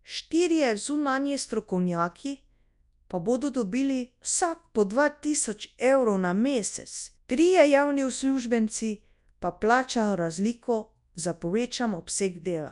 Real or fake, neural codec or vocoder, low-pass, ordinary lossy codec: fake; codec, 24 kHz, 0.9 kbps, WavTokenizer, large speech release; 10.8 kHz; none